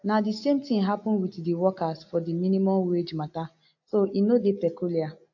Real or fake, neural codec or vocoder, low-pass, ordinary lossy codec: real; none; 7.2 kHz; AAC, 48 kbps